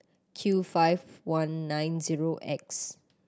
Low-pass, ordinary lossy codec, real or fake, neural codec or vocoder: none; none; real; none